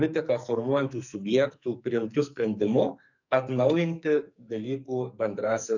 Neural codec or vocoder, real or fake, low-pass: codec, 44.1 kHz, 2.6 kbps, SNAC; fake; 7.2 kHz